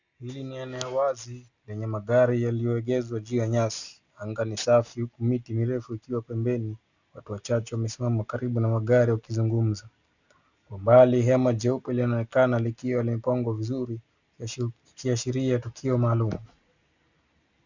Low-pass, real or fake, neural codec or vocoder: 7.2 kHz; real; none